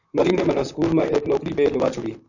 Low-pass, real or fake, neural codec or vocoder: 7.2 kHz; fake; vocoder, 44.1 kHz, 128 mel bands, Pupu-Vocoder